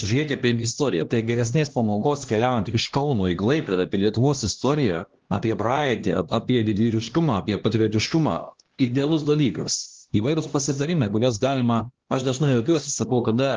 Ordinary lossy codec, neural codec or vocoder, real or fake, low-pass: Opus, 16 kbps; codec, 16 kHz, 1 kbps, X-Codec, HuBERT features, trained on LibriSpeech; fake; 7.2 kHz